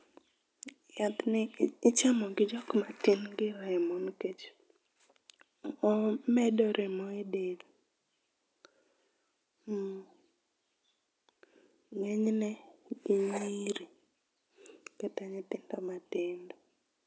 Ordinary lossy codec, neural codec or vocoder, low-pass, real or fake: none; none; none; real